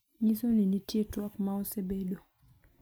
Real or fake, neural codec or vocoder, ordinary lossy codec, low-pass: real; none; none; none